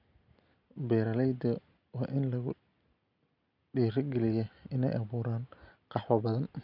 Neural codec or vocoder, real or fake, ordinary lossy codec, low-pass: none; real; none; 5.4 kHz